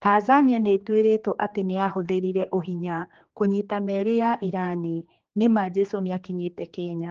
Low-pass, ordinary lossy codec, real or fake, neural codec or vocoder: 7.2 kHz; Opus, 16 kbps; fake; codec, 16 kHz, 2 kbps, X-Codec, HuBERT features, trained on general audio